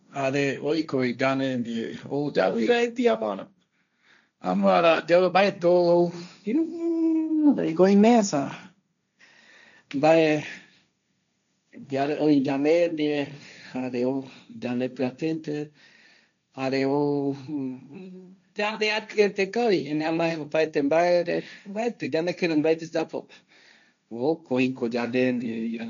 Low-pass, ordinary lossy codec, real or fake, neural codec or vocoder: 7.2 kHz; none; fake; codec, 16 kHz, 1.1 kbps, Voila-Tokenizer